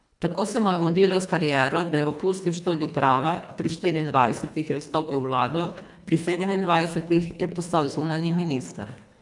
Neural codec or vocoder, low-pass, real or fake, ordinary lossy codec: codec, 24 kHz, 1.5 kbps, HILCodec; 10.8 kHz; fake; none